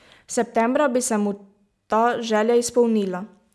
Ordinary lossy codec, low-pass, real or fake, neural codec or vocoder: none; none; real; none